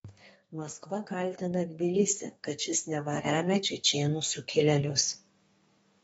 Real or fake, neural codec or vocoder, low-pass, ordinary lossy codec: fake; codec, 32 kHz, 1.9 kbps, SNAC; 14.4 kHz; AAC, 24 kbps